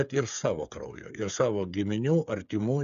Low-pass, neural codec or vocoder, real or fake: 7.2 kHz; codec, 16 kHz, 8 kbps, FreqCodec, smaller model; fake